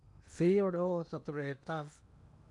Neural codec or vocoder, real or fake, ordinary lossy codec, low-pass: codec, 16 kHz in and 24 kHz out, 0.8 kbps, FocalCodec, streaming, 65536 codes; fake; MP3, 96 kbps; 10.8 kHz